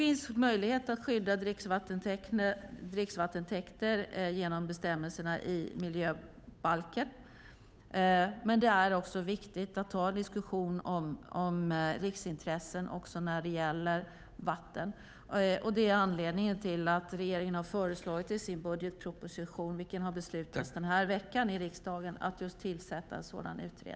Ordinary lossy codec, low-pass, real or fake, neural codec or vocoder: none; none; fake; codec, 16 kHz, 8 kbps, FunCodec, trained on Chinese and English, 25 frames a second